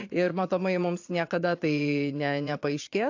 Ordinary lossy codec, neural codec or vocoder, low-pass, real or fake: AAC, 48 kbps; vocoder, 22.05 kHz, 80 mel bands, Vocos; 7.2 kHz; fake